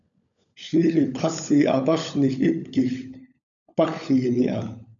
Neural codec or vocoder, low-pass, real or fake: codec, 16 kHz, 16 kbps, FunCodec, trained on LibriTTS, 50 frames a second; 7.2 kHz; fake